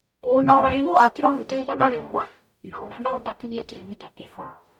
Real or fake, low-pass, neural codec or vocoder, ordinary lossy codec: fake; 19.8 kHz; codec, 44.1 kHz, 0.9 kbps, DAC; none